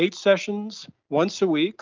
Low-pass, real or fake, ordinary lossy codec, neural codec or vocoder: 7.2 kHz; real; Opus, 24 kbps; none